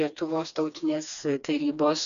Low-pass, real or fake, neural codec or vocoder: 7.2 kHz; fake; codec, 16 kHz, 2 kbps, FreqCodec, smaller model